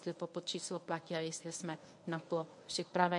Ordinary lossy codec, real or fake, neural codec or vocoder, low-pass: MP3, 48 kbps; fake; codec, 24 kHz, 0.9 kbps, WavTokenizer, medium speech release version 1; 10.8 kHz